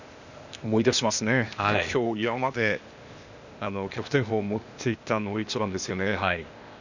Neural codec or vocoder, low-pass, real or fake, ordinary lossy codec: codec, 16 kHz, 0.8 kbps, ZipCodec; 7.2 kHz; fake; none